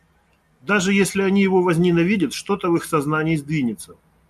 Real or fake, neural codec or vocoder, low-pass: real; none; 14.4 kHz